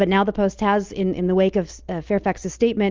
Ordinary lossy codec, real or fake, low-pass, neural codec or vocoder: Opus, 24 kbps; real; 7.2 kHz; none